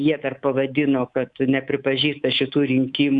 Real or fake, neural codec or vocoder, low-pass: fake; codec, 24 kHz, 3.1 kbps, DualCodec; 10.8 kHz